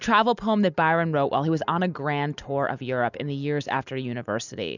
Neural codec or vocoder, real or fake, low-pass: none; real; 7.2 kHz